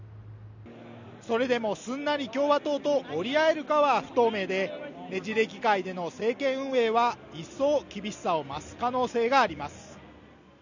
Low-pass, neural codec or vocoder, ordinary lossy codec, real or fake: 7.2 kHz; none; none; real